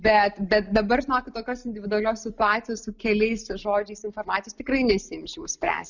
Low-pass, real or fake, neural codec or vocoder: 7.2 kHz; real; none